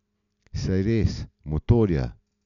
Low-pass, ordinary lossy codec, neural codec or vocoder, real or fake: 7.2 kHz; none; none; real